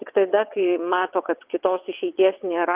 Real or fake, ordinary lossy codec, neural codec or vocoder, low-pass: fake; Opus, 32 kbps; vocoder, 22.05 kHz, 80 mel bands, WaveNeXt; 3.6 kHz